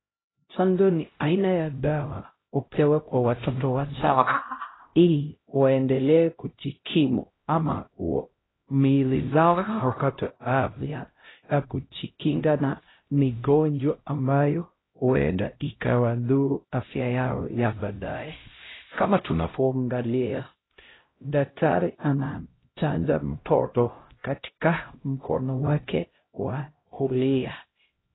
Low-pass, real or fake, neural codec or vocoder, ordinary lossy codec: 7.2 kHz; fake; codec, 16 kHz, 0.5 kbps, X-Codec, HuBERT features, trained on LibriSpeech; AAC, 16 kbps